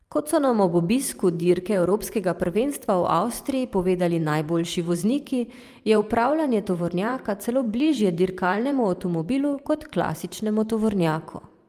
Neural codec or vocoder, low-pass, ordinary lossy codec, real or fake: vocoder, 44.1 kHz, 128 mel bands every 512 samples, BigVGAN v2; 14.4 kHz; Opus, 32 kbps; fake